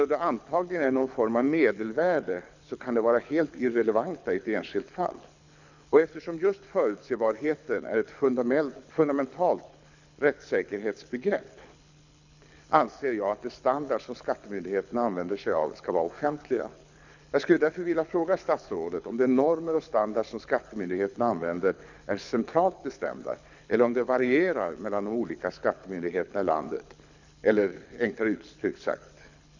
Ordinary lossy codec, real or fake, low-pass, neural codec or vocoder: none; fake; 7.2 kHz; codec, 24 kHz, 6 kbps, HILCodec